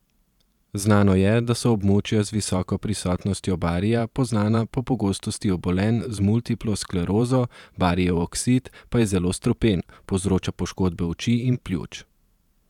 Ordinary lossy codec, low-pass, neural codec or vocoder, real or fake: none; 19.8 kHz; vocoder, 44.1 kHz, 128 mel bands every 512 samples, BigVGAN v2; fake